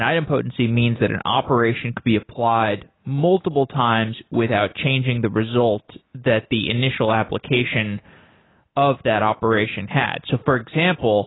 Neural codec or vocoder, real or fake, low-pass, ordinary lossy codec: none; real; 7.2 kHz; AAC, 16 kbps